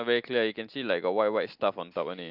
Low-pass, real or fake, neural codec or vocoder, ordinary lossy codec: 5.4 kHz; real; none; Opus, 32 kbps